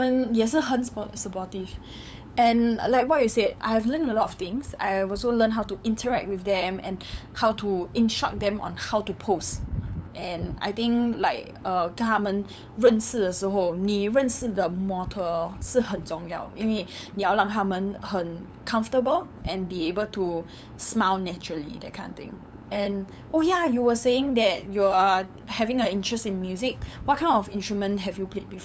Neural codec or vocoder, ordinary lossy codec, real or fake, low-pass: codec, 16 kHz, 8 kbps, FunCodec, trained on LibriTTS, 25 frames a second; none; fake; none